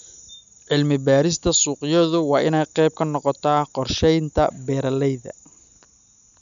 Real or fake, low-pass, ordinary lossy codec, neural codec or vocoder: real; 7.2 kHz; none; none